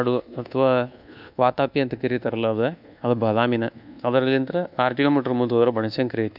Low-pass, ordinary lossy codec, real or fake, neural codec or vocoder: 5.4 kHz; none; fake; codec, 24 kHz, 1.2 kbps, DualCodec